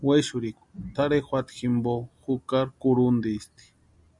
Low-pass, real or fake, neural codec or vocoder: 10.8 kHz; real; none